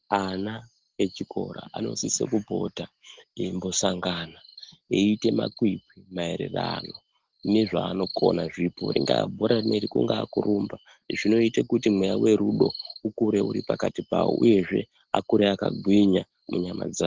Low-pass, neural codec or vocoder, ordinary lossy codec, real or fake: 7.2 kHz; none; Opus, 16 kbps; real